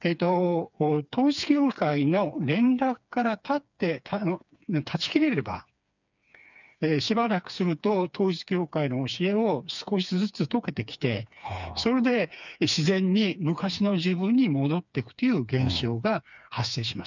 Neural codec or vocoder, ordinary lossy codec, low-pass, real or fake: codec, 16 kHz, 4 kbps, FreqCodec, smaller model; none; 7.2 kHz; fake